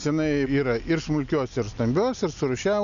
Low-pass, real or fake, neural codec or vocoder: 7.2 kHz; real; none